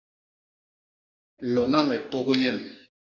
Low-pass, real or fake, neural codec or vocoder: 7.2 kHz; fake; codec, 44.1 kHz, 2.6 kbps, DAC